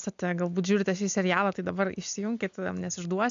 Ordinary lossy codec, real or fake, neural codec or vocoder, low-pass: AAC, 64 kbps; real; none; 7.2 kHz